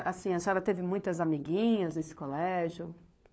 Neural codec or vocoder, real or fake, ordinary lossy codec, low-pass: codec, 16 kHz, 4 kbps, FunCodec, trained on LibriTTS, 50 frames a second; fake; none; none